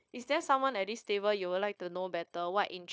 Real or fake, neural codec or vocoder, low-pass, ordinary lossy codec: fake; codec, 16 kHz, 0.9 kbps, LongCat-Audio-Codec; none; none